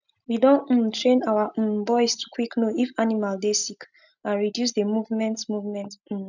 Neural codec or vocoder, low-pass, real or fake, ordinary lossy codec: none; 7.2 kHz; real; none